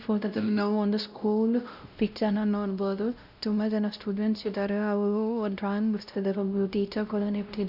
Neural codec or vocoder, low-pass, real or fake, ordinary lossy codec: codec, 16 kHz, 0.5 kbps, X-Codec, WavLM features, trained on Multilingual LibriSpeech; 5.4 kHz; fake; none